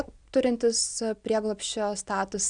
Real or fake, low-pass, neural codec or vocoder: real; 9.9 kHz; none